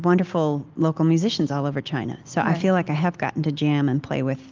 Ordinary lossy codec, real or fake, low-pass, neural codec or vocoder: Opus, 24 kbps; real; 7.2 kHz; none